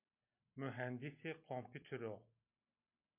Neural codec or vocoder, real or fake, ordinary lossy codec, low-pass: none; real; MP3, 32 kbps; 3.6 kHz